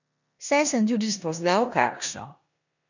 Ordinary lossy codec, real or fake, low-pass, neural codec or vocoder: none; fake; 7.2 kHz; codec, 16 kHz in and 24 kHz out, 0.9 kbps, LongCat-Audio-Codec, four codebook decoder